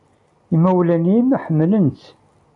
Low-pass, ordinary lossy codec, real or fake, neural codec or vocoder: 10.8 kHz; AAC, 64 kbps; real; none